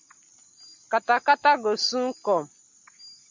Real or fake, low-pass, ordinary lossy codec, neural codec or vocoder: real; 7.2 kHz; MP3, 64 kbps; none